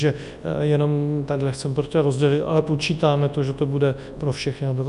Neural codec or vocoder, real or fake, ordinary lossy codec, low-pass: codec, 24 kHz, 0.9 kbps, WavTokenizer, large speech release; fake; AAC, 96 kbps; 10.8 kHz